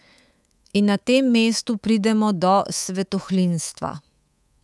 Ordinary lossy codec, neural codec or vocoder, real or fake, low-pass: none; codec, 24 kHz, 3.1 kbps, DualCodec; fake; none